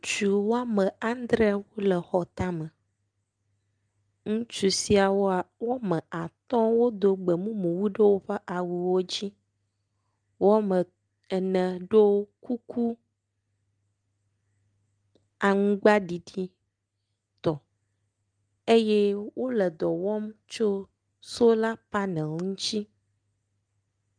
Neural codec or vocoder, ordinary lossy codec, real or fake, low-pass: none; Opus, 32 kbps; real; 9.9 kHz